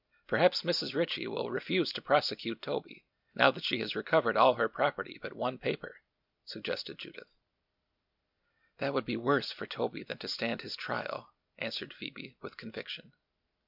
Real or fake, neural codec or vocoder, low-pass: real; none; 5.4 kHz